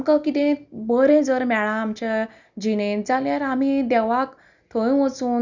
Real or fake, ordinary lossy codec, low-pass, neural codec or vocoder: real; none; 7.2 kHz; none